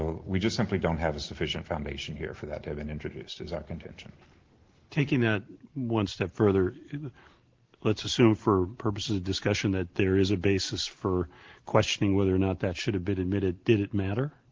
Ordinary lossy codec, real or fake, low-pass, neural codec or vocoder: Opus, 16 kbps; real; 7.2 kHz; none